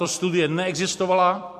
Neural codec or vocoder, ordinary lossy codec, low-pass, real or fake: vocoder, 44.1 kHz, 128 mel bands every 256 samples, BigVGAN v2; MP3, 64 kbps; 14.4 kHz; fake